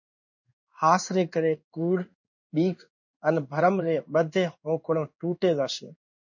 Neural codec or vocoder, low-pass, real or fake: codec, 16 kHz in and 24 kHz out, 1 kbps, XY-Tokenizer; 7.2 kHz; fake